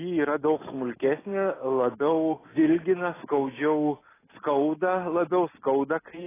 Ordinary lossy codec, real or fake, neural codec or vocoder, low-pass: AAC, 16 kbps; real; none; 3.6 kHz